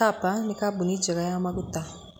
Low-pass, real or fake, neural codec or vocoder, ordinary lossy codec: none; real; none; none